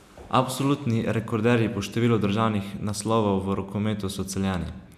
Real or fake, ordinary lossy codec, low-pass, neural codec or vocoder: real; none; 14.4 kHz; none